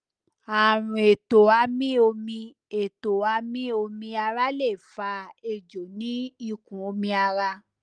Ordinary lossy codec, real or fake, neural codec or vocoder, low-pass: Opus, 32 kbps; real; none; 9.9 kHz